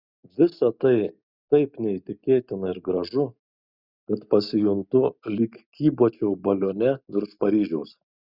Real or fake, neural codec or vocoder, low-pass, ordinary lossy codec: real; none; 5.4 kHz; Opus, 64 kbps